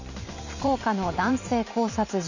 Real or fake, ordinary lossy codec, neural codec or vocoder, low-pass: fake; none; vocoder, 44.1 kHz, 128 mel bands every 512 samples, BigVGAN v2; 7.2 kHz